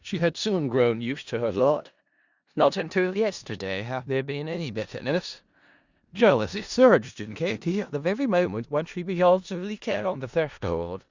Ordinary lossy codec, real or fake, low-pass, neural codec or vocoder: Opus, 64 kbps; fake; 7.2 kHz; codec, 16 kHz in and 24 kHz out, 0.4 kbps, LongCat-Audio-Codec, four codebook decoder